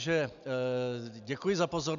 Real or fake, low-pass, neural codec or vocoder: real; 7.2 kHz; none